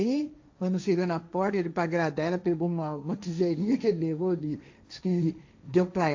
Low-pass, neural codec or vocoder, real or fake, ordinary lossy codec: 7.2 kHz; codec, 16 kHz, 1.1 kbps, Voila-Tokenizer; fake; AAC, 48 kbps